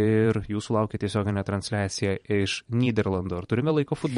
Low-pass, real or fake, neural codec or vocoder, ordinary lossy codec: 19.8 kHz; fake; vocoder, 44.1 kHz, 128 mel bands every 512 samples, BigVGAN v2; MP3, 48 kbps